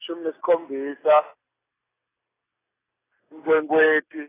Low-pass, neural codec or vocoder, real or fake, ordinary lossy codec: 3.6 kHz; none; real; AAC, 16 kbps